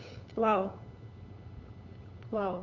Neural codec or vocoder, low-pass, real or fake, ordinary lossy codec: codec, 16 kHz, 8 kbps, FunCodec, trained on LibriTTS, 25 frames a second; 7.2 kHz; fake; MP3, 64 kbps